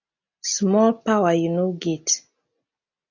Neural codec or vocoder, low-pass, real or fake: none; 7.2 kHz; real